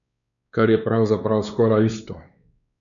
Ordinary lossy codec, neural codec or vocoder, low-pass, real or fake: none; codec, 16 kHz, 2 kbps, X-Codec, WavLM features, trained on Multilingual LibriSpeech; 7.2 kHz; fake